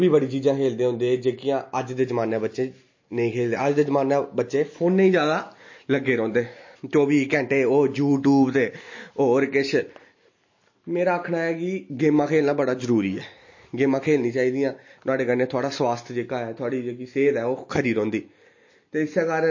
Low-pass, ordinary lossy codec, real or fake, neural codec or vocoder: 7.2 kHz; MP3, 32 kbps; real; none